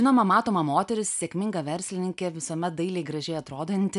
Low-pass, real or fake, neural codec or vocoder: 10.8 kHz; real; none